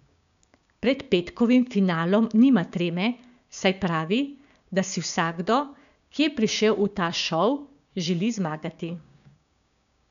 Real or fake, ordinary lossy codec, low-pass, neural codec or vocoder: fake; none; 7.2 kHz; codec, 16 kHz, 6 kbps, DAC